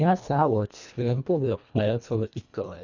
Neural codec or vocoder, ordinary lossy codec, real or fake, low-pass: codec, 24 kHz, 1.5 kbps, HILCodec; none; fake; 7.2 kHz